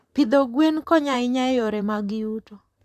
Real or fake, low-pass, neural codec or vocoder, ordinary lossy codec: fake; 14.4 kHz; vocoder, 44.1 kHz, 128 mel bands, Pupu-Vocoder; none